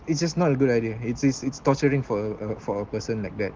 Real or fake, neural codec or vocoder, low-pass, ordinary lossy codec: real; none; 7.2 kHz; Opus, 16 kbps